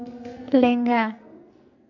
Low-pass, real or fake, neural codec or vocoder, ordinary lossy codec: 7.2 kHz; fake; codec, 44.1 kHz, 2.6 kbps, SNAC; none